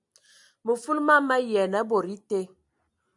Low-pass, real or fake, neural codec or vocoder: 10.8 kHz; real; none